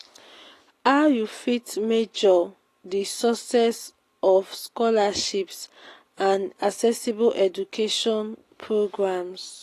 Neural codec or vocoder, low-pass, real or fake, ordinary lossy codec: none; 14.4 kHz; real; AAC, 48 kbps